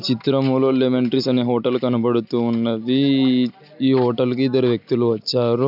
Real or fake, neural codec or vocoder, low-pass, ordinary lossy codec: real; none; 5.4 kHz; none